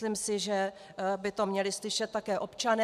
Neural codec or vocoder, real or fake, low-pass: none; real; 14.4 kHz